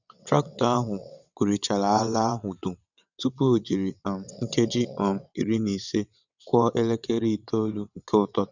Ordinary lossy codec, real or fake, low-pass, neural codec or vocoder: none; fake; 7.2 kHz; vocoder, 22.05 kHz, 80 mel bands, WaveNeXt